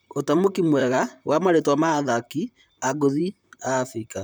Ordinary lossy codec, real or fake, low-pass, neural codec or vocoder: none; fake; none; vocoder, 44.1 kHz, 128 mel bands, Pupu-Vocoder